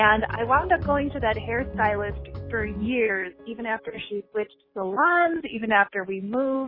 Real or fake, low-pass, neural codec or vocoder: real; 5.4 kHz; none